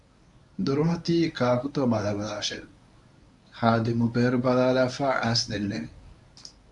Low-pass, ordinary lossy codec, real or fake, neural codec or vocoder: 10.8 kHz; AAC, 64 kbps; fake; codec, 24 kHz, 0.9 kbps, WavTokenizer, medium speech release version 1